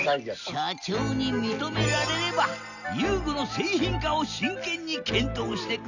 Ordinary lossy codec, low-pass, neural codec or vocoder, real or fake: none; 7.2 kHz; none; real